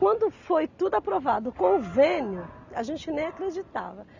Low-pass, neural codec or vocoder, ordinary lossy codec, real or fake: 7.2 kHz; none; none; real